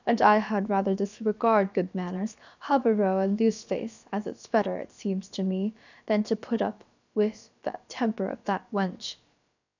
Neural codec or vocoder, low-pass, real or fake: codec, 16 kHz, about 1 kbps, DyCAST, with the encoder's durations; 7.2 kHz; fake